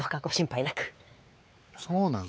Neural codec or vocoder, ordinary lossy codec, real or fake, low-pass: codec, 16 kHz, 4 kbps, X-Codec, WavLM features, trained on Multilingual LibriSpeech; none; fake; none